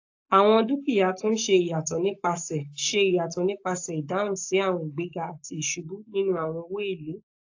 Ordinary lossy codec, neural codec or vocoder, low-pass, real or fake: AAC, 48 kbps; codec, 44.1 kHz, 7.8 kbps, Pupu-Codec; 7.2 kHz; fake